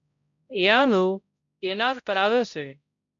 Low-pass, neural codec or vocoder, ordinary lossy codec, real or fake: 7.2 kHz; codec, 16 kHz, 0.5 kbps, X-Codec, HuBERT features, trained on balanced general audio; MP3, 64 kbps; fake